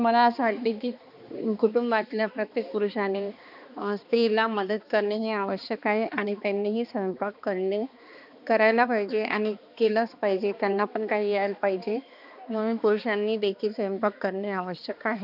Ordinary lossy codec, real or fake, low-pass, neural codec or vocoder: none; fake; 5.4 kHz; codec, 16 kHz, 2 kbps, X-Codec, HuBERT features, trained on balanced general audio